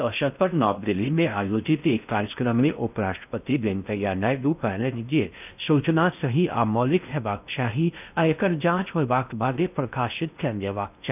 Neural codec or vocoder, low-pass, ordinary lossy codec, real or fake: codec, 16 kHz in and 24 kHz out, 0.6 kbps, FocalCodec, streaming, 4096 codes; 3.6 kHz; none; fake